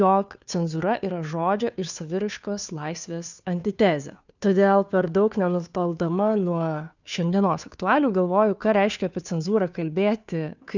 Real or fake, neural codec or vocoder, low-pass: fake; codec, 16 kHz, 2 kbps, FunCodec, trained on Chinese and English, 25 frames a second; 7.2 kHz